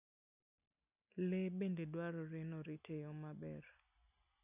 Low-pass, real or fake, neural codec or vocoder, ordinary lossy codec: 3.6 kHz; real; none; none